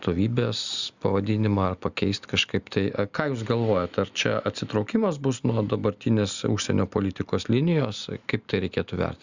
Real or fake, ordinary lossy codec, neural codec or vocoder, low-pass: real; Opus, 64 kbps; none; 7.2 kHz